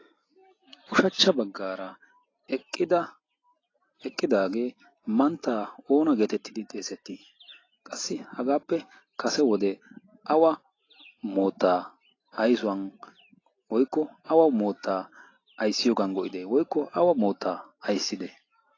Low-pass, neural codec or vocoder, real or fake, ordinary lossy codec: 7.2 kHz; none; real; AAC, 32 kbps